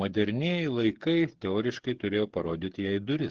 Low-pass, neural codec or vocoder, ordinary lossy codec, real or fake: 7.2 kHz; codec, 16 kHz, 8 kbps, FreqCodec, smaller model; Opus, 16 kbps; fake